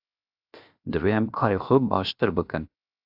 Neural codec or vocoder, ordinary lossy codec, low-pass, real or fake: codec, 16 kHz, 0.7 kbps, FocalCodec; AAC, 48 kbps; 5.4 kHz; fake